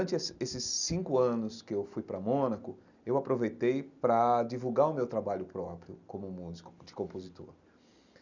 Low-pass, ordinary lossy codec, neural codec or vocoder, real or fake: 7.2 kHz; none; none; real